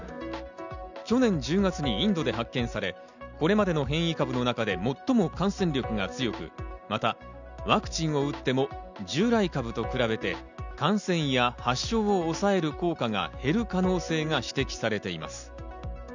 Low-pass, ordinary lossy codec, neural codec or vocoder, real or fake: 7.2 kHz; none; none; real